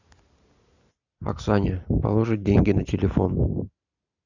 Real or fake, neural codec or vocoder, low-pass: real; none; 7.2 kHz